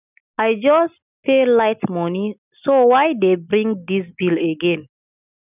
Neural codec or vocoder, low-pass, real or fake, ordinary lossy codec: none; 3.6 kHz; real; none